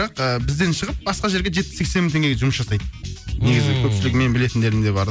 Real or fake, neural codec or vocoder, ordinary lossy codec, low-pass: real; none; none; none